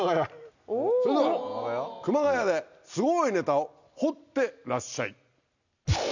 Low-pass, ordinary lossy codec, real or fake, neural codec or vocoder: 7.2 kHz; none; real; none